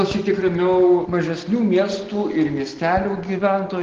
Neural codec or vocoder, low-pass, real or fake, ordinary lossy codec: none; 7.2 kHz; real; Opus, 16 kbps